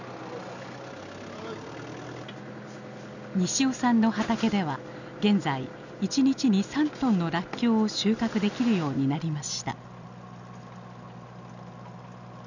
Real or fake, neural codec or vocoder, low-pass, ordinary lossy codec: real; none; 7.2 kHz; none